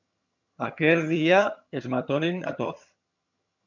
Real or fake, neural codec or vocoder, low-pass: fake; vocoder, 22.05 kHz, 80 mel bands, HiFi-GAN; 7.2 kHz